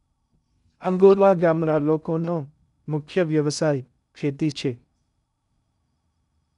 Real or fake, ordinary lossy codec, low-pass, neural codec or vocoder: fake; none; 10.8 kHz; codec, 16 kHz in and 24 kHz out, 0.6 kbps, FocalCodec, streaming, 2048 codes